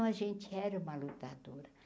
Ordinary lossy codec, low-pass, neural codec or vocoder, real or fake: none; none; none; real